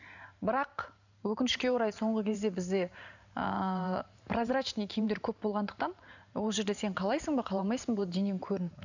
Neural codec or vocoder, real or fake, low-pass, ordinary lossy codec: vocoder, 44.1 kHz, 128 mel bands every 512 samples, BigVGAN v2; fake; 7.2 kHz; none